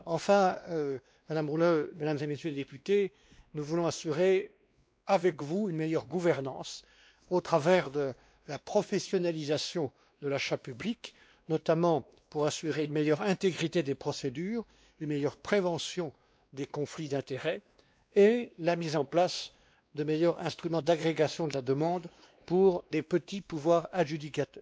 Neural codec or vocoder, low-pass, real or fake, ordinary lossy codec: codec, 16 kHz, 2 kbps, X-Codec, WavLM features, trained on Multilingual LibriSpeech; none; fake; none